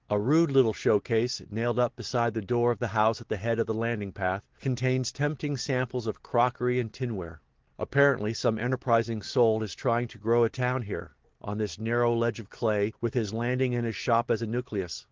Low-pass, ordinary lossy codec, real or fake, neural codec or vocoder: 7.2 kHz; Opus, 16 kbps; real; none